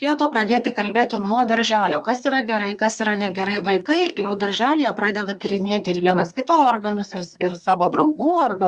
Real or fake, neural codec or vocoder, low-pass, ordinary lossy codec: fake; codec, 24 kHz, 1 kbps, SNAC; 10.8 kHz; Opus, 64 kbps